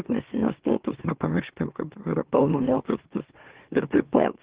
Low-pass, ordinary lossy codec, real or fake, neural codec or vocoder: 3.6 kHz; Opus, 16 kbps; fake; autoencoder, 44.1 kHz, a latent of 192 numbers a frame, MeloTTS